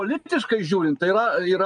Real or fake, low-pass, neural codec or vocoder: real; 9.9 kHz; none